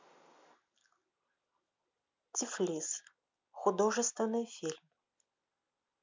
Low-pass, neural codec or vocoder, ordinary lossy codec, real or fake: 7.2 kHz; none; MP3, 64 kbps; real